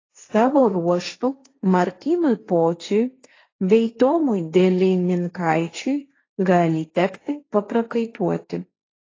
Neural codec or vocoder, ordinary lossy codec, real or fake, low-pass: codec, 16 kHz, 1.1 kbps, Voila-Tokenizer; AAC, 32 kbps; fake; 7.2 kHz